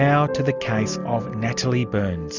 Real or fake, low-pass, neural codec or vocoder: real; 7.2 kHz; none